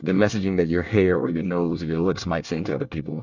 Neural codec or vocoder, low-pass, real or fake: codec, 24 kHz, 1 kbps, SNAC; 7.2 kHz; fake